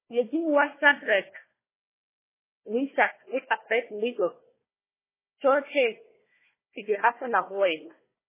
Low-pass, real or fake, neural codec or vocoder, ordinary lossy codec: 3.6 kHz; fake; codec, 16 kHz, 1 kbps, FunCodec, trained on Chinese and English, 50 frames a second; MP3, 16 kbps